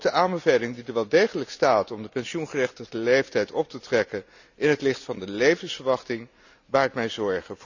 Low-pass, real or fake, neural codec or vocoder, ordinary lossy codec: 7.2 kHz; real; none; none